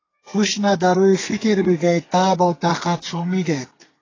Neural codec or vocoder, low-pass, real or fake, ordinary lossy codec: codec, 32 kHz, 1.9 kbps, SNAC; 7.2 kHz; fake; AAC, 32 kbps